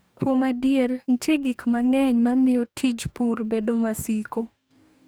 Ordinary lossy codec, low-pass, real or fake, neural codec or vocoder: none; none; fake; codec, 44.1 kHz, 2.6 kbps, DAC